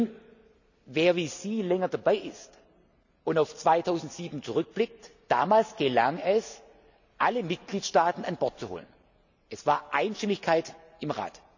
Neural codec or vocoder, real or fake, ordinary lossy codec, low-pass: none; real; none; 7.2 kHz